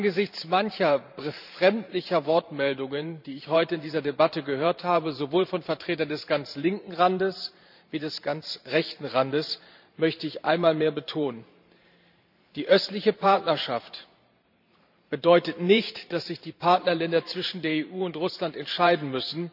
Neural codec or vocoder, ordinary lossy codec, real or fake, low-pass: vocoder, 44.1 kHz, 128 mel bands every 512 samples, BigVGAN v2; none; fake; 5.4 kHz